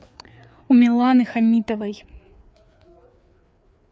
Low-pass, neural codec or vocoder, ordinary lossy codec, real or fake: none; codec, 16 kHz, 4 kbps, FreqCodec, larger model; none; fake